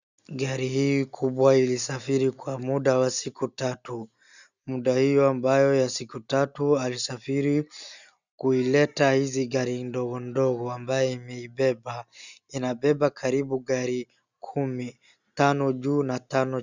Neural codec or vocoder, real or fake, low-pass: none; real; 7.2 kHz